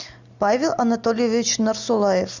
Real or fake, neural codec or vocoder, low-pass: real; none; 7.2 kHz